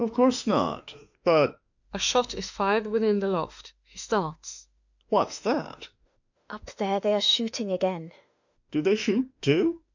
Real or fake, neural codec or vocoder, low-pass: fake; autoencoder, 48 kHz, 32 numbers a frame, DAC-VAE, trained on Japanese speech; 7.2 kHz